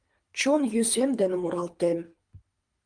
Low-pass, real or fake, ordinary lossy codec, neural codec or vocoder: 9.9 kHz; fake; Opus, 32 kbps; codec, 24 kHz, 3 kbps, HILCodec